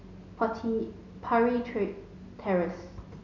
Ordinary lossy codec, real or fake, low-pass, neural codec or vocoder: none; real; 7.2 kHz; none